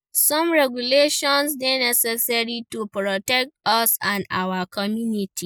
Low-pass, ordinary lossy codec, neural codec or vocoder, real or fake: none; none; none; real